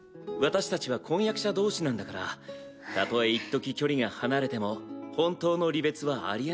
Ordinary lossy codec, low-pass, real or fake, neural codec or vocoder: none; none; real; none